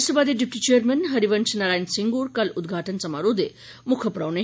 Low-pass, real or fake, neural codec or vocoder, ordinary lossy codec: none; real; none; none